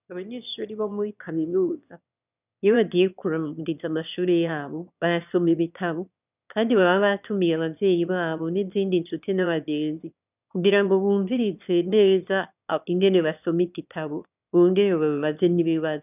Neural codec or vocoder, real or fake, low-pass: autoencoder, 22.05 kHz, a latent of 192 numbers a frame, VITS, trained on one speaker; fake; 3.6 kHz